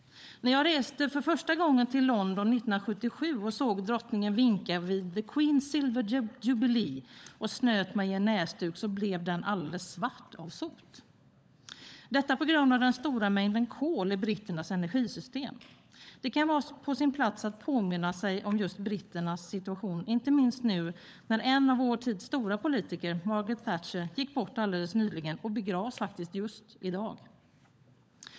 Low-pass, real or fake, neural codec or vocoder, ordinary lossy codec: none; fake; codec, 16 kHz, 16 kbps, FunCodec, trained on LibriTTS, 50 frames a second; none